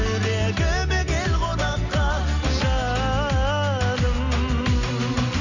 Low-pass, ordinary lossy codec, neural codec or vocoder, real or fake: 7.2 kHz; none; none; real